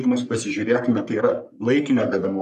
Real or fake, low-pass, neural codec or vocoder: fake; 14.4 kHz; codec, 44.1 kHz, 3.4 kbps, Pupu-Codec